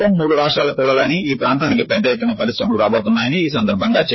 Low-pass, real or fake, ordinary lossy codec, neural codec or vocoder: 7.2 kHz; fake; MP3, 24 kbps; codec, 16 kHz, 2 kbps, FreqCodec, larger model